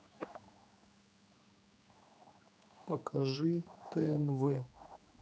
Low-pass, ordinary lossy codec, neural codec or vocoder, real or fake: none; none; codec, 16 kHz, 2 kbps, X-Codec, HuBERT features, trained on general audio; fake